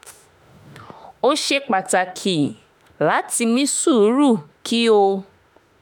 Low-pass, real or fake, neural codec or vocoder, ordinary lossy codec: none; fake; autoencoder, 48 kHz, 32 numbers a frame, DAC-VAE, trained on Japanese speech; none